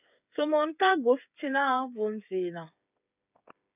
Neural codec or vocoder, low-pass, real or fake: codec, 16 kHz, 8 kbps, FreqCodec, smaller model; 3.6 kHz; fake